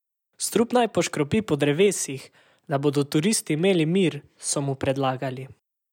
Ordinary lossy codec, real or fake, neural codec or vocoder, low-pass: none; real; none; 19.8 kHz